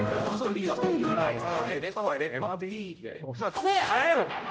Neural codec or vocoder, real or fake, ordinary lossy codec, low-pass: codec, 16 kHz, 0.5 kbps, X-Codec, HuBERT features, trained on general audio; fake; none; none